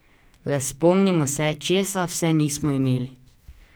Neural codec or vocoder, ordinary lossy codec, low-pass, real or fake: codec, 44.1 kHz, 2.6 kbps, SNAC; none; none; fake